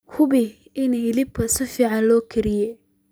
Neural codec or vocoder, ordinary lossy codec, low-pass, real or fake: none; none; none; real